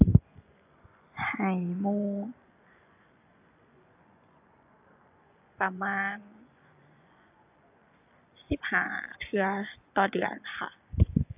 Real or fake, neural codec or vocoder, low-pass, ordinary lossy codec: fake; vocoder, 24 kHz, 100 mel bands, Vocos; 3.6 kHz; AAC, 32 kbps